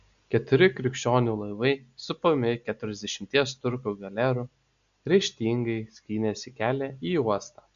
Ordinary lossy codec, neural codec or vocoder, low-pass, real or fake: AAC, 96 kbps; none; 7.2 kHz; real